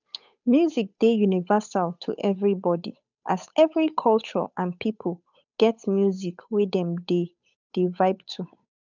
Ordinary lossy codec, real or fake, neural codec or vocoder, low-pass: none; fake; codec, 16 kHz, 8 kbps, FunCodec, trained on Chinese and English, 25 frames a second; 7.2 kHz